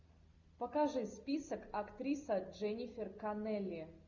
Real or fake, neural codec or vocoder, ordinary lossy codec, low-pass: real; none; Opus, 64 kbps; 7.2 kHz